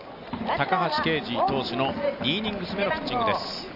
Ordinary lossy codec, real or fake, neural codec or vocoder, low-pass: none; real; none; 5.4 kHz